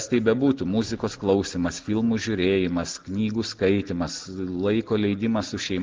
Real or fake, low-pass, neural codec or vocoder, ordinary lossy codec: real; 7.2 kHz; none; Opus, 16 kbps